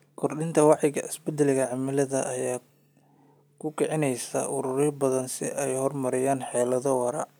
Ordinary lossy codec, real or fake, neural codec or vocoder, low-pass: none; real; none; none